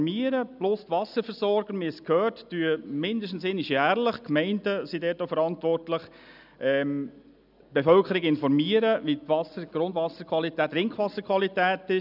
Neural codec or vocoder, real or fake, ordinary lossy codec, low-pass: none; real; none; 5.4 kHz